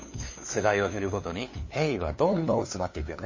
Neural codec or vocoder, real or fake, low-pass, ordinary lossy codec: codec, 16 kHz, 2 kbps, FunCodec, trained on LibriTTS, 25 frames a second; fake; 7.2 kHz; MP3, 32 kbps